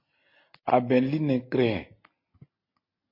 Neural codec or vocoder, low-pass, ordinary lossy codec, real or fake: none; 5.4 kHz; MP3, 32 kbps; real